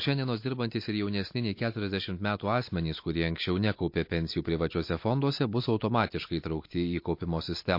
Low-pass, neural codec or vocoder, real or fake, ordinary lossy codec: 5.4 kHz; none; real; MP3, 32 kbps